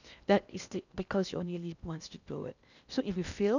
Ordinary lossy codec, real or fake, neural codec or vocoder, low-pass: none; fake; codec, 16 kHz in and 24 kHz out, 0.6 kbps, FocalCodec, streaming, 2048 codes; 7.2 kHz